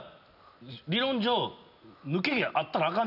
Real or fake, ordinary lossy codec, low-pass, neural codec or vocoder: real; none; 5.4 kHz; none